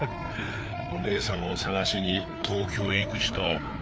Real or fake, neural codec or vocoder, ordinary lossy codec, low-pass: fake; codec, 16 kHz, 4 kbps, FreqCodec, larger model; none; none